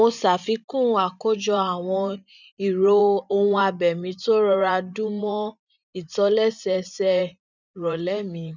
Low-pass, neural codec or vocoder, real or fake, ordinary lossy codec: 7.2 kHz; vocoder, 44.1 kHz, 128 mel bands every 512 samples, BigVGAN v2; fake; none